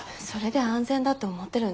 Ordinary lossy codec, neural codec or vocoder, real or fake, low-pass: none; none; real; none